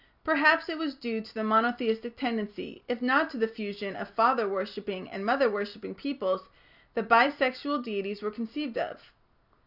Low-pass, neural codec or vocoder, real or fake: 5.4 kHz; none; real